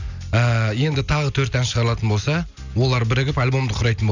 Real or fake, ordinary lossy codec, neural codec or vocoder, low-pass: real; none; none; 7.2 kHz